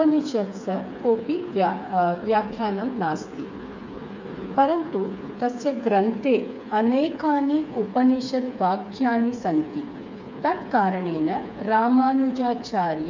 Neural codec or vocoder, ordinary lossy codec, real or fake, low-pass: codec, 16 kHz, 4 kbps, FreqCodec, smaller model; MP3, 64 kbps; fake; 7.2 kHz